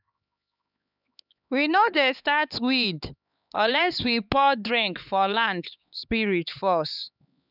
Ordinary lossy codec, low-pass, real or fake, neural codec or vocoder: none; 5.4 kHz; fake; codec, 16 kHz, 4 kbps, X-Codec, HuBERT features, trained on LibriSpeech